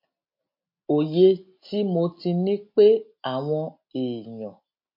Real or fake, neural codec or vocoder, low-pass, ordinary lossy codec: real; none; 5.4 kHz; MP3, 32 kbps